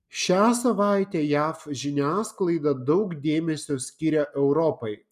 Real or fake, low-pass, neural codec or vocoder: real; 14.4 kHz; none